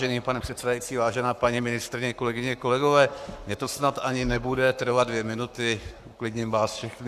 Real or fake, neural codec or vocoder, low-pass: fake; codec, 44.1 kHz, 7.8 kbps, Pupu-Codec; 14.4 kHz